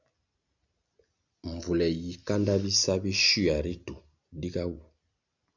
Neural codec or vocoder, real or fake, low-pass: none; real; 7.2 kHz